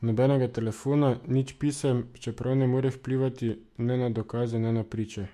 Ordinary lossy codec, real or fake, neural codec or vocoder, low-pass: MP3, 64 kbps; fake; autoencoder, 48 kHz, 128 numbers a frame, DAC-VAE, trained on Japanese speech; 14.4 kHz